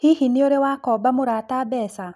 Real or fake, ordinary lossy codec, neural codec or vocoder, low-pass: real; none; none; 14.4 kHz